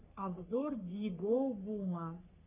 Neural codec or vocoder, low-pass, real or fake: codec, 44.1 kHz, 3.4 kbps, Pupu-Codec; 3.6 kHz; fake